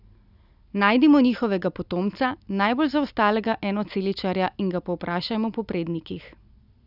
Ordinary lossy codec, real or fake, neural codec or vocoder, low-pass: none; real; none; 5.4 kHz